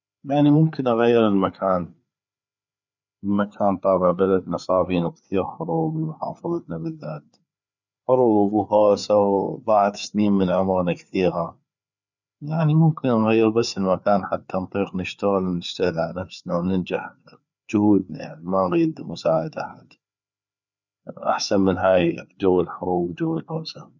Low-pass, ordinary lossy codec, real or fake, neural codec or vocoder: 7.2 kHz; none; fake; codec, 16 kHz, 4 kbps, FreqCodec, larger model